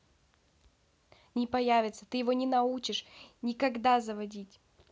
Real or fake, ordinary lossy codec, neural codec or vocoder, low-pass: real; none; none; none